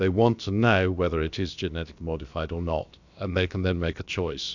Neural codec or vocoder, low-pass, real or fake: codec, 16 kHz, about 1 kbps, DyCAST, with the encoder's durations; 7.2 kHz; fake